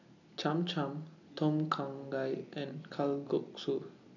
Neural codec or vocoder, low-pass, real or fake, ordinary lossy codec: none; 7.2 kHz; real; none